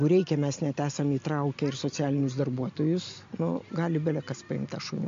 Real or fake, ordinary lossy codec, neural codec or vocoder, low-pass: real; MP3, 48 kbps; none; 7.2 kHz